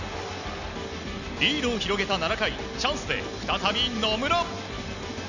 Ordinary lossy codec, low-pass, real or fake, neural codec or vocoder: none; 7.2 kHz; real; none